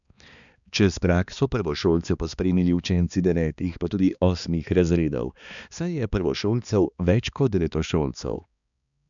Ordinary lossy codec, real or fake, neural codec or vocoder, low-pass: none; fake; codec, 16 kHz, 2 kbps, X-Codec, HuBERT features, trained on balanced general audio; 7.2 kHz